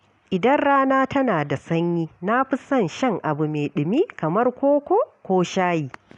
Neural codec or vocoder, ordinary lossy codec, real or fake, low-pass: none; none; real; 10.8 kHz